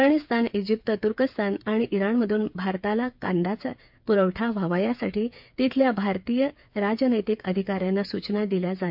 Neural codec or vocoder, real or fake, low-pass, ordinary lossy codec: codec, 16 kHz, 8 kbps, FreqCodec, smaller model; fake; 5.4 kHz; MP3, 48 kbps